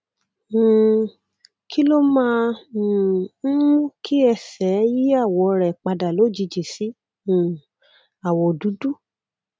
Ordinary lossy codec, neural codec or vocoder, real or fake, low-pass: none; none; real; none